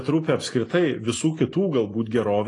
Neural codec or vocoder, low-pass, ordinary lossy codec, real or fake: none; 10.8 kHz; AAC, 32 kbps; real